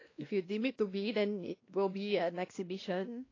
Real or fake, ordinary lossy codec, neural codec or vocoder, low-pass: fake; AAC, 32 kbps; codec, 16 kHz, 1 kbps, X-Codec, WavLM features, trained on Multilingual LibriSpeech; 7.2 kHz